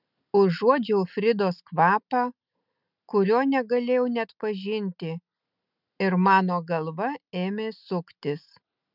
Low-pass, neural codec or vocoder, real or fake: 5.4 kHz; none; real